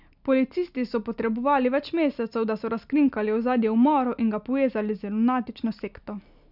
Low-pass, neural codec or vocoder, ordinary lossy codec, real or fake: 5.4 kHz; none; none; real